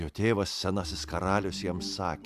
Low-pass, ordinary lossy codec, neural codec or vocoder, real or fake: 14.4 kHz; AAC, 96 kbps; none; real